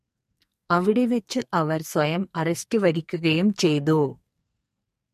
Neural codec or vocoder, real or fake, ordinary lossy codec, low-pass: codec, 44.1 kHz, 2.6 kbps, SNAC; fake; MP3, 64 kbps; 14.4 kHz